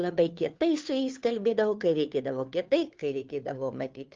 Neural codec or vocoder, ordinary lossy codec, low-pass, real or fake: codec, 16 kHz, 2 kbps, FunCodec, trained on Chinese and English, 25 frames a second; Opus, 24 kbps; 7.2 kHz; fake